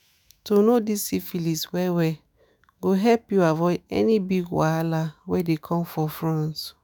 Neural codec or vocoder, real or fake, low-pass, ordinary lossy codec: autoencoder, 48 kHz, 128 numbers a frame, DAC-VAE, trained on Japanese speech; fake; none; none